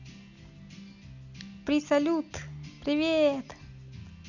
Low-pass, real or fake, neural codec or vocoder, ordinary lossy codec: 7.2 kHz; real; none; none